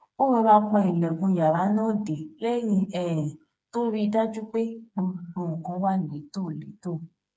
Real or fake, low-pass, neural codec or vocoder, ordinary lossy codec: fake; none; codec, 16 kHz, 4 kbps, FreqCodec, smaller model; none